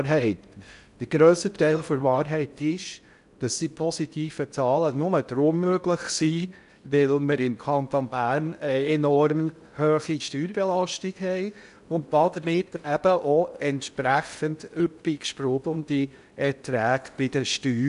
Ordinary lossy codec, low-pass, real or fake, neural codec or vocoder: none; 10.8 kHz; fake; codec, 16 kHz in and 24 kHz out, 0.6 kbps, FocalCodec, streaming, 4096 codes